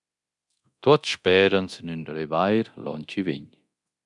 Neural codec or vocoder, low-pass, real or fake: codec, 24 kHz, 0.9 kbps, DualCodec; 10.8 kHz; fake